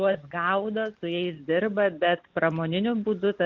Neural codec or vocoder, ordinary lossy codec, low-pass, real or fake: none; Opus, 24 kbps; 7.2 kHz; real